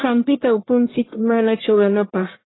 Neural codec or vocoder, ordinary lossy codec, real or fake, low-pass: codec, 44.1 kHz, 1.7 kbps, Pupu-Codec; AAC, 16 kbps; fake; 7.2 kHz